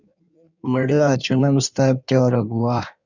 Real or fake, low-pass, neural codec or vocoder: fake; 7.2 kHz; codec, 16 kHz in and 24 kHz out, 1.1 kbps, FireRedTTS-2 codec